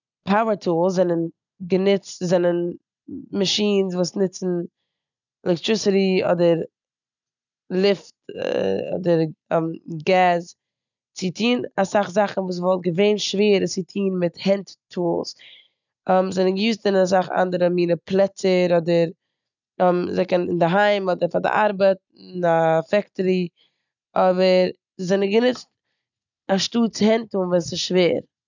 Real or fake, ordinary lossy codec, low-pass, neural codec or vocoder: real; none; 7.2 kHz; none